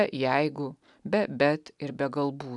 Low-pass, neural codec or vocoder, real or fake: 10.8 kHz; none; real